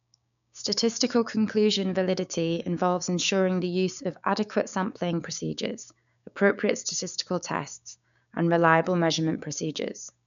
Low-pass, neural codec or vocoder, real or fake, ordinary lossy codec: 7.2 kHz; codec, 16 kHz, 6 kbps, DAC; fake; none